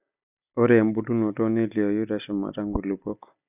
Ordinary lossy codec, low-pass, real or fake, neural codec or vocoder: none; 3.6 kHz; real; none